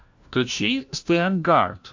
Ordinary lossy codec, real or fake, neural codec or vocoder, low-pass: AAC, 48 kbps; fake; codec, 16 kHz, 1 kbps, FunCodec, trained on LibriTTS, 50 frames a second; 7.2 kHz